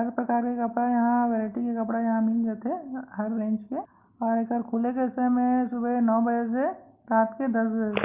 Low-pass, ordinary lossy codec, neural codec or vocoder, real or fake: 3.6 kHz; Opus, 32 kbps; none; real